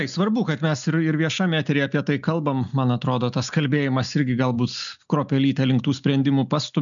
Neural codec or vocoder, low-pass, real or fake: none; 7.2 kHz; real